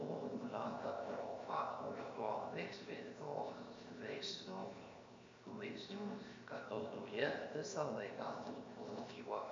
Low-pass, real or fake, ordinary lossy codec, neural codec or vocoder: 7.2 kHz; fake; MP3, 48 kbps; codec, 16 kHz, 0.7 kbps, FocalCodec